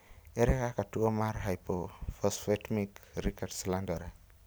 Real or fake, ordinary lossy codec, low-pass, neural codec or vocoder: real; none; none; none